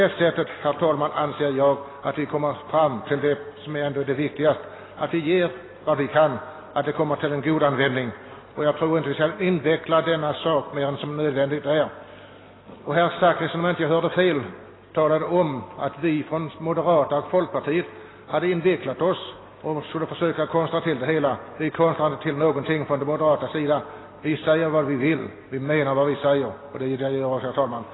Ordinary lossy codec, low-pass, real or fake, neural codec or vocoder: AAC, 16 kbps; 7.2 kHz; real; none